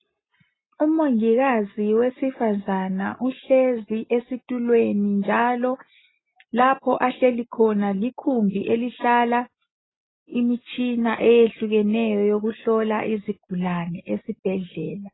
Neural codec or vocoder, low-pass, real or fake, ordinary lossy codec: none; 7.2 kHz; real; AAC, 16 kbps